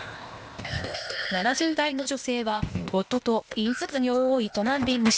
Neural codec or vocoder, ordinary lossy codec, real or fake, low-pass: codec, 16 kHz, 0.8 kbps, ZipCodec; none; fake; none